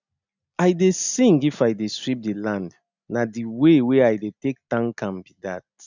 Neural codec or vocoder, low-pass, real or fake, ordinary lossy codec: none; 7.2 kHz; real; none